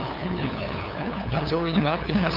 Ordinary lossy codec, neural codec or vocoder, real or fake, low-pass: none; codec, 16 kHz, 2 kbps, FunCodec, trained on LibriTTS, 25 frames a second; fake; 5.4 kHz